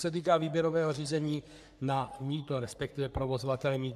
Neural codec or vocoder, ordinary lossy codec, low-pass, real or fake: codec, 44.1 kHz, 3.4 kbps, Pupu-Codec; MP3, 96 kbps; 14.4 kHz; fake